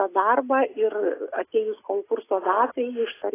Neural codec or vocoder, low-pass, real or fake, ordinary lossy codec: none; 3.6 kHz; real; AAC, 16 kbps